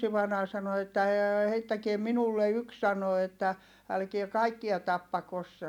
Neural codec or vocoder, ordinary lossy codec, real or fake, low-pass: none; none; real; 19.8 kHz